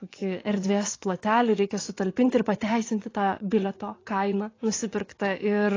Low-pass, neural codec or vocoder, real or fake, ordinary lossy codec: 7.2 kHz; vocoder, 24 kHz, 100 mel bands, Vocos; fake; AAC, 32 kbps